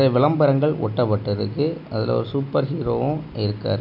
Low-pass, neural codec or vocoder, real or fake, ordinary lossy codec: 5.4 kHz; none; real; none